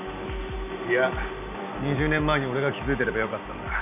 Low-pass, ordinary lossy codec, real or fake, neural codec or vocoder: 3.6 kHz; none; real; none